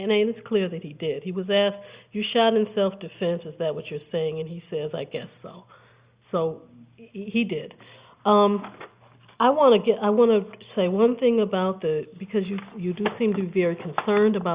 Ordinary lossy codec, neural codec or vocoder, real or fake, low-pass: Opus, 64 kbps; none; real; 3.6 kHz